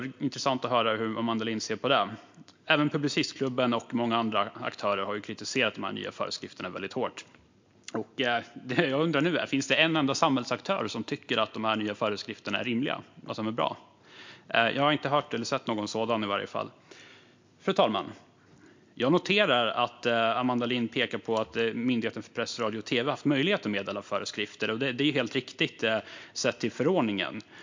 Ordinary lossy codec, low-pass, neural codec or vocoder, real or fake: MP3, 64 kbps; 7.2 kHz; none; real